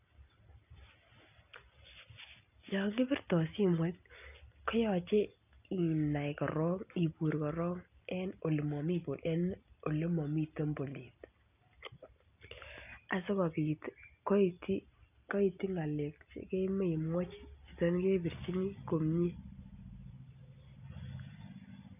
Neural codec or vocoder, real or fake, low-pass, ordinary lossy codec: none; real; 3.6 kHz; none